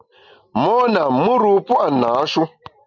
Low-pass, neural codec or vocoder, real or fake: 7.2 kHz; none; real